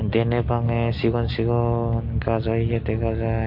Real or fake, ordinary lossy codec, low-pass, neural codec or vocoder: real; MP3, 32 kbps; 5.4 kHz; none